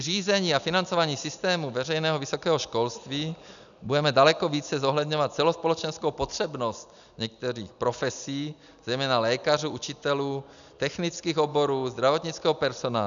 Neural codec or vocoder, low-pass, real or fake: none; 7.2 kHz; real